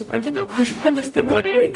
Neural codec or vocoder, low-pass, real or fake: codec, 44.1 kHz, 0.9 kbps, DAC; 10.8 kHz; fake